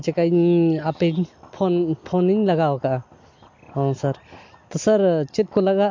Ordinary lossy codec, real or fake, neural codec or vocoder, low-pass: MP3, 48 kbps; real; none; 7.2 kHz